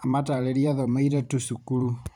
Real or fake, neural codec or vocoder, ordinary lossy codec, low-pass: fake; vocoder, 44.1 kHz, 128 mel bands every 512 samples, BigVGAN v2; none; 19.8 kHz